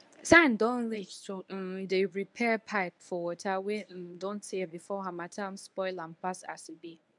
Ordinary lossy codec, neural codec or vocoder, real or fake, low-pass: none; codec, 24 kHz, 0.9 kbps, WavTokenizer, medium speech release version 1; fake; 10.8 kHz